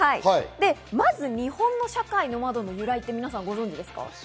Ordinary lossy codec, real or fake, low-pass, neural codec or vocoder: none; real; none; none